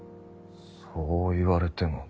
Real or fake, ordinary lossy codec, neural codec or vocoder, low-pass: real; none; none; none